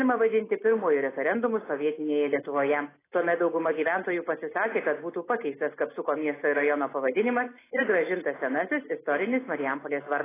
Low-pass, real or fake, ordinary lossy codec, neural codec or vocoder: 3.6 kHz; real; AAC, 16 kbps; none